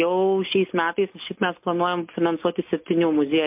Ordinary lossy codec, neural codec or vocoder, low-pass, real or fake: MP3, 32 kbps; none; 3.6 kHz; real